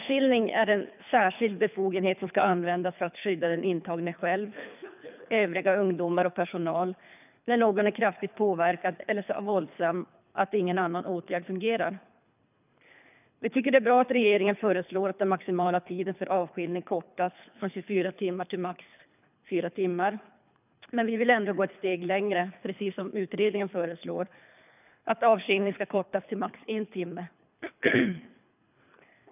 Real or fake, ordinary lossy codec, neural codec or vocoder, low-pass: fake; none; codec, 24 kHz, 3 kbps, HILCodec; 3.6 kHz